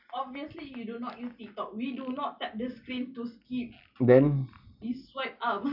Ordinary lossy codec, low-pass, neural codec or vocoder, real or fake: none; 5.4 kHz; none; real